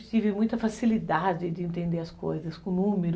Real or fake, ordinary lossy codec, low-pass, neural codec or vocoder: real; none; none; none